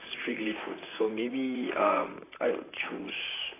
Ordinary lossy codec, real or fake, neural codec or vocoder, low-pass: AAC, 16 kbps; fake; vocoder, 44.1 kHz, 128 mel bands, Pupu-Vocoder; 3.6 kHz